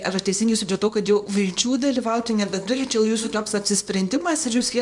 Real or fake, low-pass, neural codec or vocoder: fake; 10.8 kHz; codec, 24 kHz, 0.9 kbps, WavTokenizer, small release